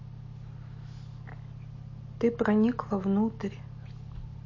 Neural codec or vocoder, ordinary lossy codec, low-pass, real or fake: none; MP3, 32 kbps; 7.2 kHz; real